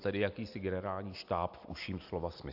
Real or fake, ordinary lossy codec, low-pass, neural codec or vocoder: real; AAC, 48 kbps; 5.4 kHz; none